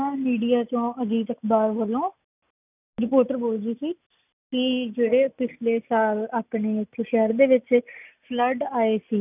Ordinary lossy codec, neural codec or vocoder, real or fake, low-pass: none; none; real; 3.6 kHz